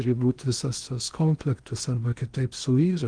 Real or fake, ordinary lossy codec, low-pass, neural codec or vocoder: fake; Opus, 24 kbps; 9.9 kHz; codec, 16 kHz in and 24 kHz out, 0.8 kbps, FocalCodec, streaming, 65536 codes